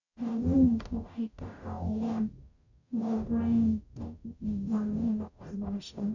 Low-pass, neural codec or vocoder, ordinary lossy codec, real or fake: 7.2 kHz; codec, 44.1 kHz, 0.9 kbps, DAC; none; fake